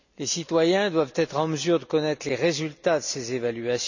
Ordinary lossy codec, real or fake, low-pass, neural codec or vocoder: none; real; 7.2 kHz; none